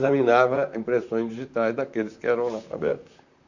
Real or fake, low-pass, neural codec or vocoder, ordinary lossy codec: fake; 7.2 kHz; vocoder, 44.1 kHz, 128 mel bands, Pupu-Vocoder; none